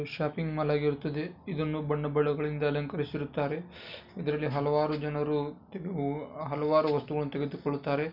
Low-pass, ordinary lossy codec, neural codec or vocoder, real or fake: 5.4 kHz; none; none; real